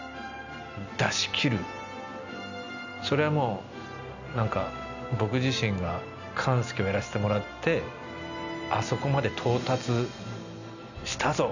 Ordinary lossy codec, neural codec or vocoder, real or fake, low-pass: none; none; real; 7.2 kHz